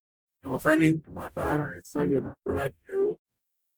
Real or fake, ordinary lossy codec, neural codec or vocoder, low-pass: fake; none; codec, 44.1 kHz, 0.9 kbps, DAC; none